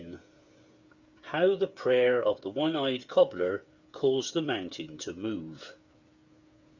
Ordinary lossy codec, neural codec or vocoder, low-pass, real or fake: Opus, 64 kbps; codec, 16 kHz, 8 kbps, FreqCodec, smaller model; 7.2 kHz; fake